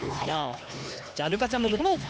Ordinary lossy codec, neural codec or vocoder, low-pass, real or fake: none; codec, 16 kHz, 2 kbps, X-Codec, HuBERT features, trained on LibriSpeech; none; fake